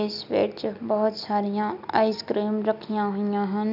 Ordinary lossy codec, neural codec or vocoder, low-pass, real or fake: none; none; 5.4 kHz; real